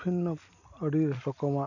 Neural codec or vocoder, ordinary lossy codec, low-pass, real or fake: none; none; 7.2 kHz; real